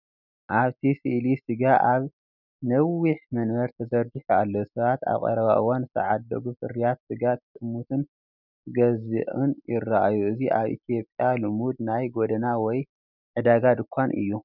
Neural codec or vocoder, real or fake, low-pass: none; real; 5.4 kHz